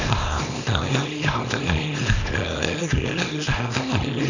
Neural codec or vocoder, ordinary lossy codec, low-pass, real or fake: codec, 24 kHz, 0.9 kbps, WavTokenizer, small release; none; 7.2 kHz; fake